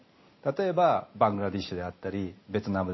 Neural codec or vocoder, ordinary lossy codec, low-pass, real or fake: none; MP3, 24 kbps; 7.2 kHz; real